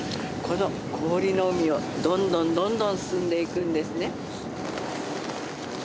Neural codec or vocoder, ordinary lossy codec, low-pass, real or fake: none; none; none; real